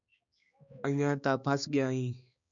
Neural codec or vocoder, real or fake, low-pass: codec, 16 kHz, 2 kbps, X-Codec, HuBERT features, trained on balanced general audio; fake; 7.2 kHz